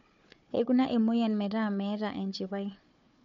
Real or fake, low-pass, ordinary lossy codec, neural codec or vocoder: fake; 7.2 kHz; MP3, 48 kbps; codec, 16 kHz, 4 kbps, FunCodec, trained on Chinese and English, 50 frames a second